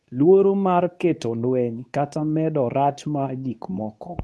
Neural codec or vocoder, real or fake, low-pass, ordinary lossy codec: codec, 24 kHz, 0.9 kbps, WavTokenizer, medium speech release version 2; fake; none; none